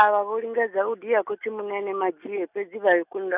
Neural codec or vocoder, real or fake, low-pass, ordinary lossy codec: none; real; 3.6 kHz; none